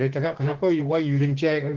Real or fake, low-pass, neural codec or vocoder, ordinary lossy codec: fake; 7.2 kHz; autoencoder, 48 kHz, 32 numbers a frame, DAC-VAE, trained on Japanese speech; Opus, 16 kbps